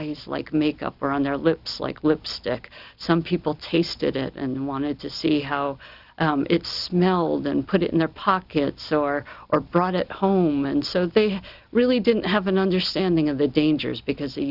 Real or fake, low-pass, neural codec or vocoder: real; 5.4 kHz; none